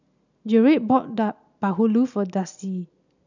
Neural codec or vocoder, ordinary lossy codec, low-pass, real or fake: none; none; 7.2 kHz; real